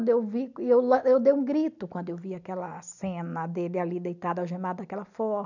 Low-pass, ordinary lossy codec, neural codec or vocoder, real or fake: 7.2 kHz; none; none; real